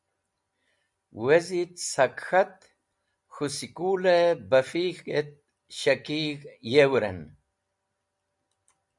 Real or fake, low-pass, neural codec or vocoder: real; 10.8 kHz; none